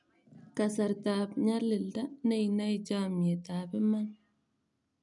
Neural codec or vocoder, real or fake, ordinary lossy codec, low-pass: none; real; none; 10.8 kHz